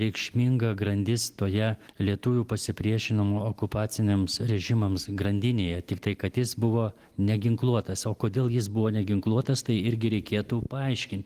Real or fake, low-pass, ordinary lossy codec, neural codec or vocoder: real; 14.4 kHz; Opus, 24 kbps; none